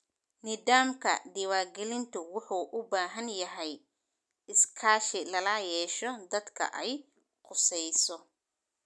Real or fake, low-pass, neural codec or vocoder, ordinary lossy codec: real; none; none; none